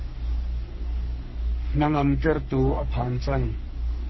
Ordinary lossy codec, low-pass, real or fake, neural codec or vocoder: MP3, 24 kbps; 7.2 kHz; fake; codec, 44.1 kHz, 3.4 kbps, Pupu-Codec